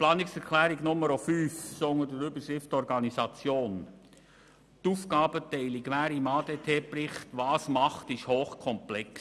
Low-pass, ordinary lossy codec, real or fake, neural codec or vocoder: none; none; real; none